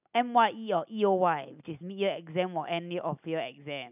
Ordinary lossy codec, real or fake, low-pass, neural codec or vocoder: none; real; 3.6 kHz; none